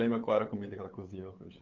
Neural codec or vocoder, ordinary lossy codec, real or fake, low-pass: codec, 16 kHz, 8 kbps, FunCodec, trained on LibriTTS, 25 frames a second; Opus, 32 kbps; fake; 7.2 kHz